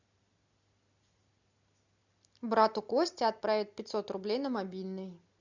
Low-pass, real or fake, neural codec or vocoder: 7.2 kHz; real; none